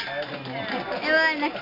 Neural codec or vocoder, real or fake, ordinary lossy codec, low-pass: none; real; AAC, 32 kbps; 5.4 kHz